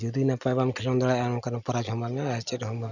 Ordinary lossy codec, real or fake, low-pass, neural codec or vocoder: none; real; 7.2 kHz; none